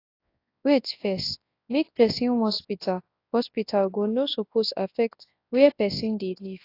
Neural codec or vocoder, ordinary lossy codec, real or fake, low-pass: codec, 24 kHz, 0.9 kbps, WavTokenizer, large speech release; AAC, 32 kbps; fake; 5.4 kHz